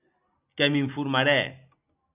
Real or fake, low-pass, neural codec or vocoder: real; 3.6 kHz; none